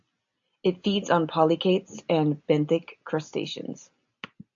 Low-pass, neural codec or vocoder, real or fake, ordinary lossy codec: 7.2 kHz; none; real; AAC, 64 kbps